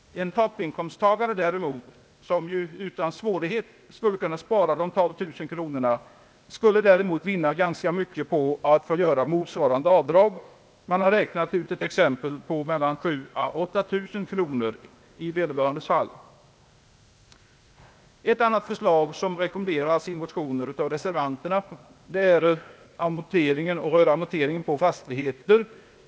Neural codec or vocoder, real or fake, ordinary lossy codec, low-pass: codec, 16 kHz, 0.8 kbps, ZipCodec; fake; none; none